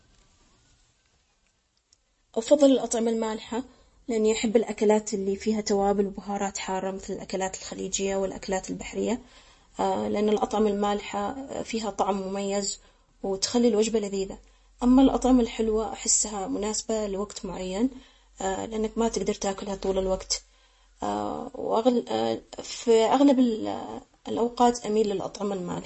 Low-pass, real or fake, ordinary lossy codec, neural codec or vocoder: 10.8 kHz; real; MP3, 32 kbps; none